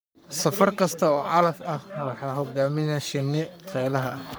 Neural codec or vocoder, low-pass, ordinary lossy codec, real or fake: codec, 44.1 kHz, 3.4 kbps, Pupu-Codec; none; none; fake